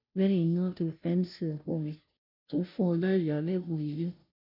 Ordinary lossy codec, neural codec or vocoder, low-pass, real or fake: none; codec, 16 kHz, 0.5 kbps, FunCodec, trained on Chinese and English, 25 frames a second; 5.4 kHz; fake